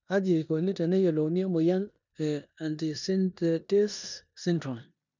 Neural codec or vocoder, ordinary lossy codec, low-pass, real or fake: codec, 16 kHz in and 24 kHz out, 0.9 kbps, LongCat-Audio-Codec, four codebook decoder; none; 7.2 kHz; fake